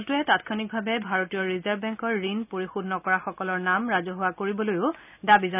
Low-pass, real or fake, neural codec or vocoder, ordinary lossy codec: 3.6 kHz; real; none; none